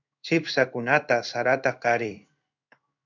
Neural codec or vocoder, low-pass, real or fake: codec, 16 kHz in and 24 kHz out, 1 kbps, XY-Tokenizer; 7.2 kHz; fake